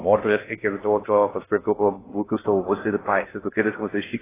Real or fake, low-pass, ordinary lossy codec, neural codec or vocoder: fake; 3.6 kHz; AAC, 16 kbps; codec, 16 kHz in and 24 kHz out, 0.6 kbps, FocalCodec, streaming, 2048 codes